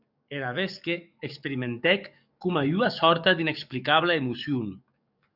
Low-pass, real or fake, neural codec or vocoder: 5.4 kHz; fake; codec, 44.1 kHz, 7.8 kbps, DAC